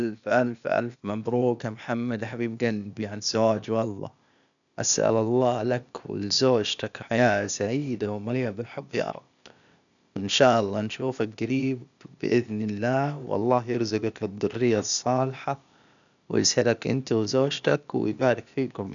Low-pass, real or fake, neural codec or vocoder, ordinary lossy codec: 7.2 kHz; fake; codec, 16 kHz, 0.8 kbps, ZipCodec; none